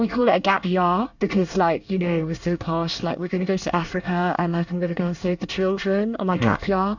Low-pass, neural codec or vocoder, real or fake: 7.2 kHz; codec, 24 kHz, 1 kbps, SNAC; fake